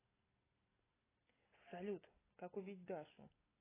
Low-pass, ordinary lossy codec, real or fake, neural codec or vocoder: 3.6 kHz; AAC, 16 kbps; real; none